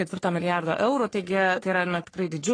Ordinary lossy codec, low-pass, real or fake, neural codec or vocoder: AAC, 32 kbps; 9.9 kHz; fake; codec, 44.1 kHz, 3.4 kbps, Pupu-Codec